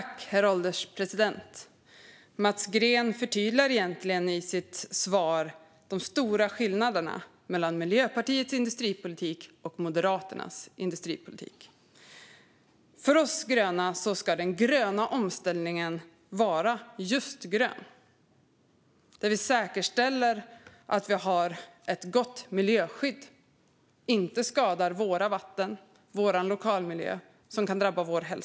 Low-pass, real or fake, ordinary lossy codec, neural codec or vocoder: none; real; none; none